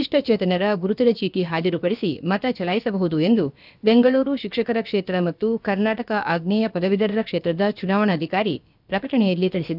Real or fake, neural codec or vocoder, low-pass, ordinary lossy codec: fake; codec, 16 kHz, about 1 kbps, DyCAST, with the encoder's durations; 5.4 kHz; none